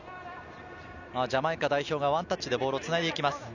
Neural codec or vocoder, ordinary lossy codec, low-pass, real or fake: none; none; 7.2 kHz; real